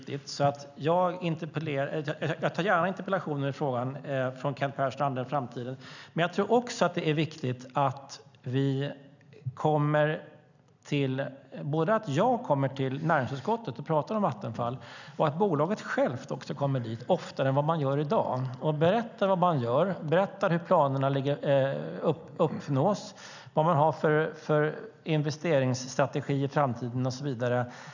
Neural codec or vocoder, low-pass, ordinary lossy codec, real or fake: none; 7.2 kHz; none; real